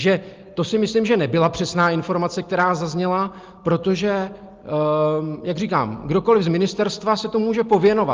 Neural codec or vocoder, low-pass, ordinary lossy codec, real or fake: none; 7.2 kHz; Opus, 32 kbps; real